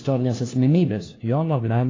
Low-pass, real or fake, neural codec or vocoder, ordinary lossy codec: 7.2 kHz; fake; codec, 16 kHz, 1 kbps, FunCodec, trained on LibriTTS, 50 frames a second; AAC, 32 kbps